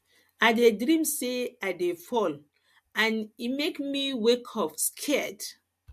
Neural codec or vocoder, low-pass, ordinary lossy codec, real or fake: none; 14.4 kHz; MP3, 64 kbps; real